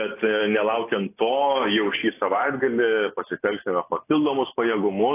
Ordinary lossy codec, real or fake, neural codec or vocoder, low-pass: AAC, 24 kbps; real; none; 3.6 kHz